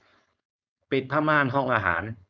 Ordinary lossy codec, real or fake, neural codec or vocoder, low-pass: none; fake; codec, 16 kHz, 4.8 kbps, FACodec; none